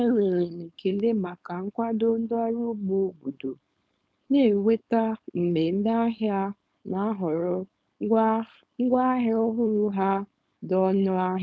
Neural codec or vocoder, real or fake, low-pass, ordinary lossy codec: codec, 16 kHz, 4.8 kbps, FACodec; fake; none; none